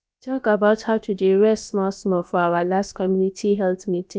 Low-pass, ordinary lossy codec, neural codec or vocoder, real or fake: none; none; codec, 16 kHz, about 1 kbps, DyCAST, with the encoder's durations; fake